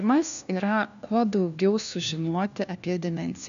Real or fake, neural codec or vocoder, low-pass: fake; codec, 16 kHz, 1 kbps, FunCodec, trained on LibriTTS, 50 frames a second; 7.2 kHz